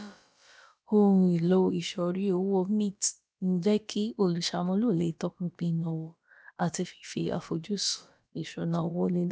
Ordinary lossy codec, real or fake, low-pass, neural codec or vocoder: none; fake; none; codec, 16 kHz, about 1 kbps, DyCAST, with the encoder's durations